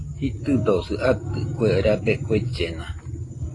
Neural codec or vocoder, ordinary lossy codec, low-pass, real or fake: none; AAC, 32 kbps; 10.8 kHz; real